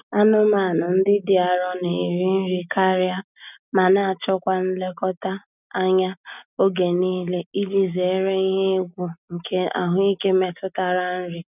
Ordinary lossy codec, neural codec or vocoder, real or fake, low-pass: none; none; real; 3.6 kHz